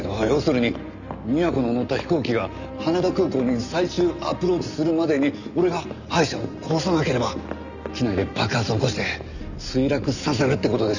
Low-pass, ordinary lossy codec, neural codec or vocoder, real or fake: 7.2 kHz; none; none; real